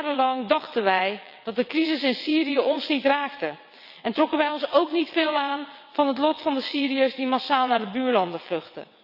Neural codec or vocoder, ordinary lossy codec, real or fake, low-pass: vocoder, 22.05 kHz, 80 mel bands, WaveNeXt; none; fake; 5.4 kHz